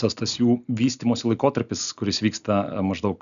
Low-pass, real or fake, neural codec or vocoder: 7.2 kHz; real; none